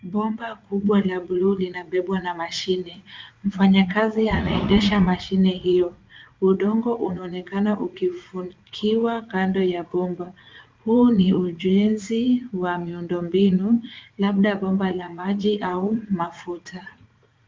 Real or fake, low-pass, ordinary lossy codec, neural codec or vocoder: fake; 7.2 kHz; Opus, 24 kbps; vocoder, 44.1 kHz, 80 mel bands, Vocos